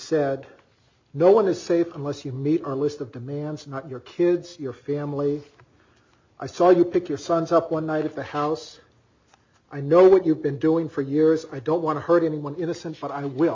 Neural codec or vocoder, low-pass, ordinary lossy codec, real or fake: none; 7.2 kHz; MP3, 48 kbps; real